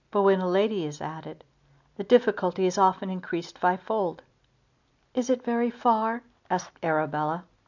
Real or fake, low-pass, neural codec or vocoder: real; 7.2 kHz; none